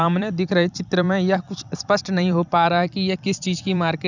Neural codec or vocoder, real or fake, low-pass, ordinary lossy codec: vocoder, 44.1 kHz, 128 mel bands every 256 samples, BigVGAN v2; fake; 7.2 kHz; none